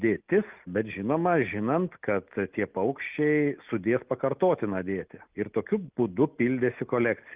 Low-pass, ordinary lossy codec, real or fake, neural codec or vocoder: 3.6 kHz; Opus, 32 kbps; real; none